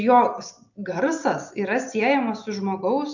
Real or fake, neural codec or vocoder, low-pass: real; none; 7.2 kHz